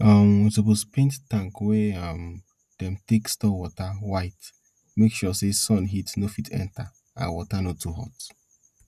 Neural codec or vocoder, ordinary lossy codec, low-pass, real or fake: none; none; 14.4 kHz; real